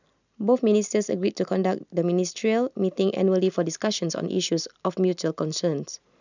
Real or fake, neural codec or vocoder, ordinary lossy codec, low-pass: real; none; none; 7.2 kHz